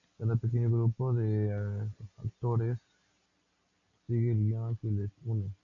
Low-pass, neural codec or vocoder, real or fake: 7.2 kHz; none; real